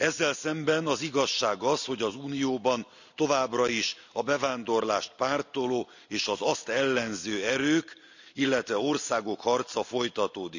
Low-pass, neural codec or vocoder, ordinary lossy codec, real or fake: 7.2 kHz; none; none; real